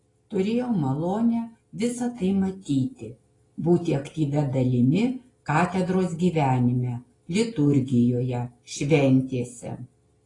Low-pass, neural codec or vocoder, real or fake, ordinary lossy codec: 10.8 kHz; none; real; AAC, 32 kbps